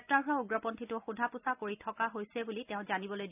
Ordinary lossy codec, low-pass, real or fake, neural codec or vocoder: none; 3.6 kHz; real; none